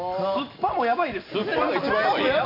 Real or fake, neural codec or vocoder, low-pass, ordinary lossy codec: real; none; 5.4 kHz; none